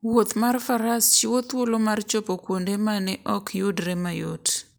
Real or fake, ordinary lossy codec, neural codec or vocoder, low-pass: real; none; none; none